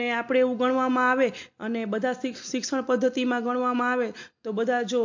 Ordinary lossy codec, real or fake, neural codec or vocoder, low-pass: MP3, 48 kbps; real; none; 7.2 kHz